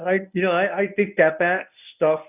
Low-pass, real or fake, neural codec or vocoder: 3.6 kHz; fake; codec, 24 kHz, 0.9 kbps, WavTokenizer, medium speech release version 1